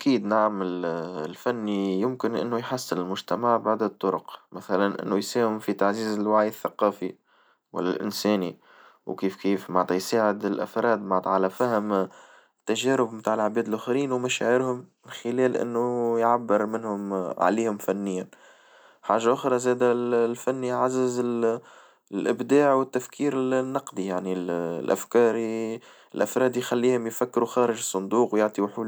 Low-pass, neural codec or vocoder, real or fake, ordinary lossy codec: none; none; real; none